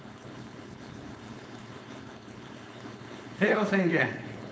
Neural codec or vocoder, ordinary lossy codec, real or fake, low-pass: codec, 16 kHz, 4.8 kbps, FACodec; none; fake; none